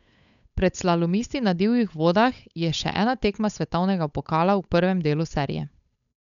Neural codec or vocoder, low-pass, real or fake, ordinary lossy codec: codec, 16 kHz, 8 kbps, FunCodec, trained on Chinese and English, 25 frames a second; 7.2 kHz; fake; none